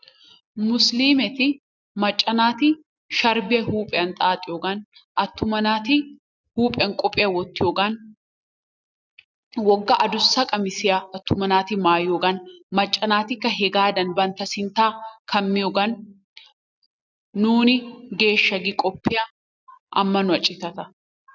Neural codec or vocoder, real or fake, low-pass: none; real; 7.2 kHz